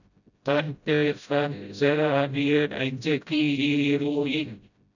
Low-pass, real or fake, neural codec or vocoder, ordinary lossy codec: 7.2 kHz; fake; codec, 16 kHz, 0.5 kbps, FreqCodec, smaller model; none